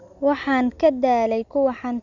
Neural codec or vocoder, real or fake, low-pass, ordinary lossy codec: none; real; 7.2 kHz; none